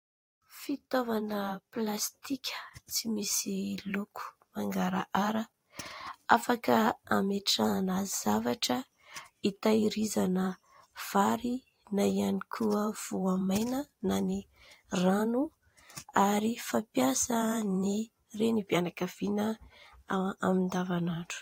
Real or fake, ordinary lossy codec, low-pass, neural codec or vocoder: fake; AAC, 48 kbps; 19.8 kHz; vocoder, 44.1 kHz, 128 mel bands every 512 samples, BigVGAN v2